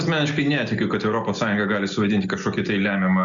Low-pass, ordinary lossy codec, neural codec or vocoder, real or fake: 7.2 kHz; MP3, 64 kbps; none; real